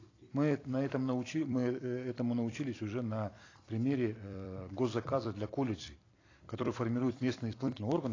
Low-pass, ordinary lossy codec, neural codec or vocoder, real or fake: 7.2 kHz; AAC, 32 kbps; vocoder, 44.1 kHz, 128 mel bands every 256 samples, BigVGAN v2; fake